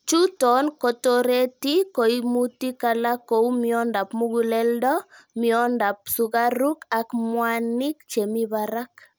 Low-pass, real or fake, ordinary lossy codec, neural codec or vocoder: none; real; none; none